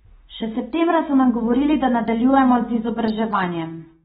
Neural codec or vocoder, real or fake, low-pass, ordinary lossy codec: none; real; 9.9 kHz; AAC, 16 kbps